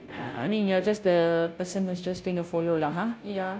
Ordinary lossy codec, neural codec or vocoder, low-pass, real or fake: none; codec, 16 kHz, 0.5 kbps, FunCodec, trained on Chinese and English, 25 frames a second; none; fake